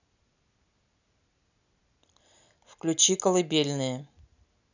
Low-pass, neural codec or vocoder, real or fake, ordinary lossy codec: 7.2 kHz; none; real; none